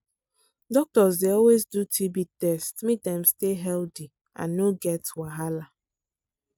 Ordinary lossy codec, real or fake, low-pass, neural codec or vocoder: none; real; none; none